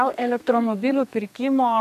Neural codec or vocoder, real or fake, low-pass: codec, 32 kHz, 1.9 kbps, SNAC; fake; 14.4 kHz